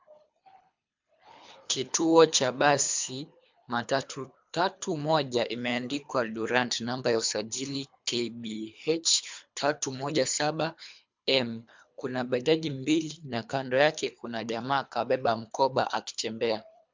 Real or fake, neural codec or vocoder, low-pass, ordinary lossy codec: fake; codec, 24 kHz, 3 kbps, HILCodec; 7.2 kHz; MP3, 64 kbps